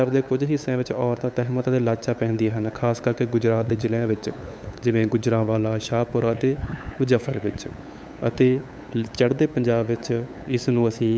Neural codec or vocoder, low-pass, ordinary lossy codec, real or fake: codec, 16 kHz, 8 kbps, FunCodec, trained on LibriTTS, 25 frames a second; none; none; fake